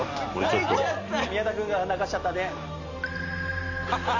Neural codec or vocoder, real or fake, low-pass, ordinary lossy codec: none; real; 7.2 kHz; AAC, 48 kbps